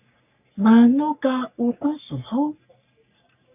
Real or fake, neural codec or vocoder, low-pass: fake; codec, 44.1 kHz, 3.4 kbps, Pupu-Codec; 3.6 kHz